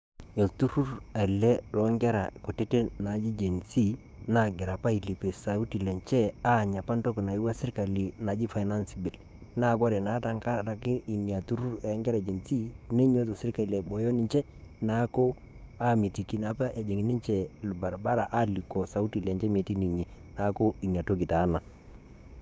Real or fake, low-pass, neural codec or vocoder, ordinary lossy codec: fake; none; codec, 16 kHz, 6 kbps, DAC; none